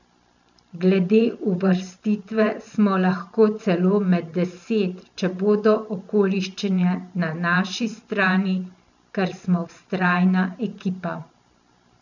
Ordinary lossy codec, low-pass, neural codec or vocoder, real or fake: none; 7.2 kHz; vocoder, 44.1 kHz, 128 mel bands every 512 samples, BigVGAN v2; fake